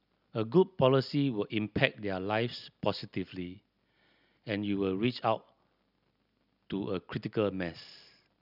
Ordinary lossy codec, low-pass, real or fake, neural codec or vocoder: none; 5.4 kHz; real; none